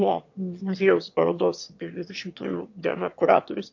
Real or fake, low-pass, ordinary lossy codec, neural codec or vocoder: fake; 7.2 kHz; MP3, 48 kbps; autoencoder, 22.05 kHz, a latent of 192 numbers a frame, VITS, trained on one speaker